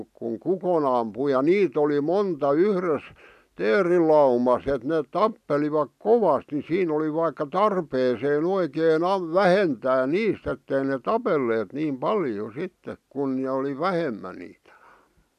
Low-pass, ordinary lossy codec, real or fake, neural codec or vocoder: 14.4 kHz; none; real; none